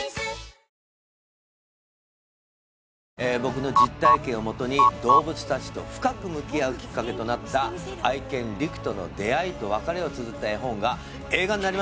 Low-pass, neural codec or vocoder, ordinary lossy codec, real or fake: none; none; none; real